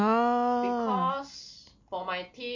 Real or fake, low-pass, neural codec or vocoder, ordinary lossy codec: real; 7.2 kHz; none; MP3, 48 kbps